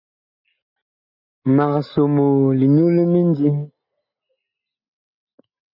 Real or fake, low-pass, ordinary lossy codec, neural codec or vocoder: real; 5.4 kHz; MP3, 48 kbps; none